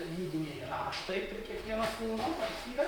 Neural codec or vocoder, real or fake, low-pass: vocoder, 44.1 kHz, 128 mel bands, Pupu-Vocoder; fake; 19.8 kHz